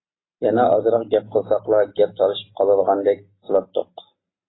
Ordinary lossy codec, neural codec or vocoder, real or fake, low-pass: AAC, 16 kbps; none; real; 7.2 kHz